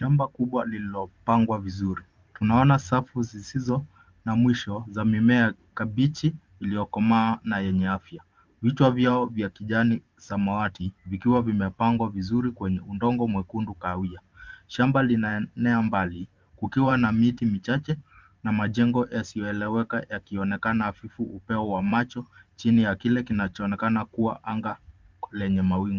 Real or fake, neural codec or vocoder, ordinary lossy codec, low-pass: fake; vocoder, 44.1 kHz, 128 mel bands every 512 samples, BigVGAN v2; Opus, 32 kbps; 7.2 kHz